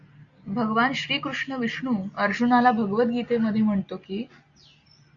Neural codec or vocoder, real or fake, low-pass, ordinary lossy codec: none; real; 7.2 kHz; Opus, 64 kbps